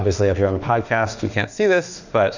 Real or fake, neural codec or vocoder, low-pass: fake; autoencoder, 48 kHz, 32 numbers a frame, DAC-VAE, trained on Japanese speech; 7.2 kHz